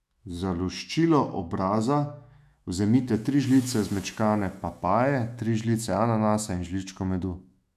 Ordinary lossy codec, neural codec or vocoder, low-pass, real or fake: none; autoencoder, 48 kHz, 128 numbers a frame, DAC-VAE, trained on Japanese speech; 14.4 kHz; fake